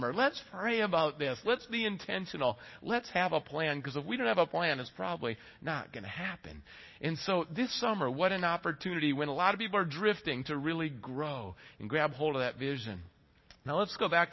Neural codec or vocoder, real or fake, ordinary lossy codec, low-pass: none; real; MP3, 24 kbps; 7.2 kHz